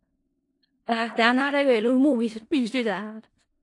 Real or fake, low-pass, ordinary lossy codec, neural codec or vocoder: fake; 10.8 kHz; AAC, 48 kbps; codec, 16 kHz in and 24 kHz out, 0.4 kbps, LongCat-Audio-Codec, four codebook decoder